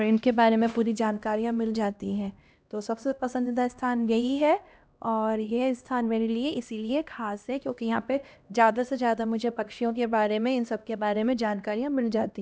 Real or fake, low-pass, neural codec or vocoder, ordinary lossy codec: fake; none; codec, 16 kHz, 1 kbps, X-Codec, HuBERT features, trained on LibriSpeech; none